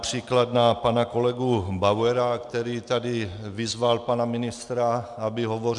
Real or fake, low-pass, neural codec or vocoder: real; 10.8 kHz; none